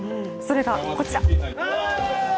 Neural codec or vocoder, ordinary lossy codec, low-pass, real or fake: none; none; none; real